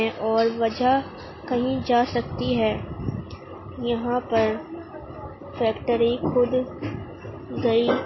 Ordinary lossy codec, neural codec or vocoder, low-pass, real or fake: MP3, 24 kbps; none; 7.2 kHz; real